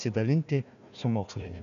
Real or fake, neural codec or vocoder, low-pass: fake; codec, 16 kHz, 1 kbps, FunCodec, trained on Chinese and English, 50 frames a second; 7.2 kHz